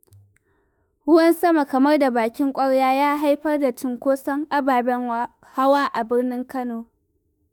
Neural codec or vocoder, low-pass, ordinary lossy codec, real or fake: autoencoder, 48 kHz, 32 numbers a frame, DAC-VAE, trained on Japanese speech; none; none; fake